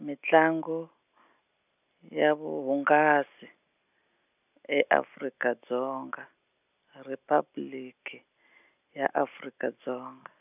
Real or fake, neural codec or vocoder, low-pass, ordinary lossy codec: real; none; 3.6 kHz; none